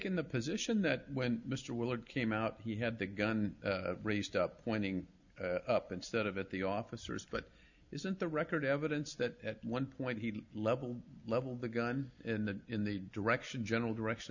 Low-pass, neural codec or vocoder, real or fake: 7.2 kHz; none; real